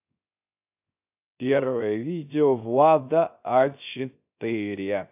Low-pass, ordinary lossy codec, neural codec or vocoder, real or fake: 3.6 kHz; AAC, 32 kbps; codec, 16 kHz, 0.3 kbps, FocalCodec; fake